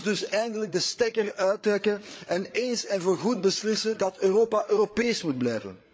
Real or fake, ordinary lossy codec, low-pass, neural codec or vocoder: fake; none; none; codec, 16 kHz, 8 kbps, FreqCodec, larger model